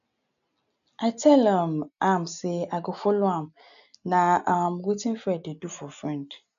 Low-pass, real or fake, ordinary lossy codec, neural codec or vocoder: 7.2 kHz; real; none; none